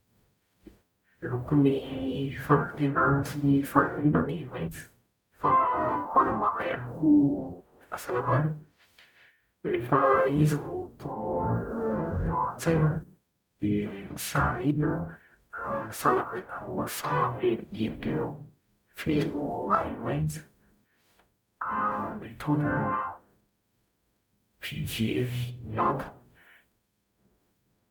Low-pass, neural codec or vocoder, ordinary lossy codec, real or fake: 19.8 kHz; codec, 44.1 kHz, 0.9 kbps, DAC; none; fake